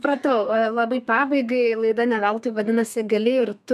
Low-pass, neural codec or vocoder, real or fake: 14.4 kHz; codec, 32 kHz, 1.9 kbps, SNAC; fake